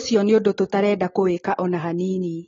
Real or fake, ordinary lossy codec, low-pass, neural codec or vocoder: real; AAC, 24 kbps; 7.2 kHz; none